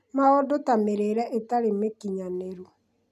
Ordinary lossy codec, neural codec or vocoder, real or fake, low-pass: none; none; real; 14.4 kHz